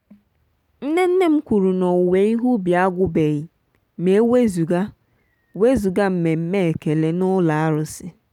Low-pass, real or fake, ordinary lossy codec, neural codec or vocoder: 19.8 kHz; real; none; none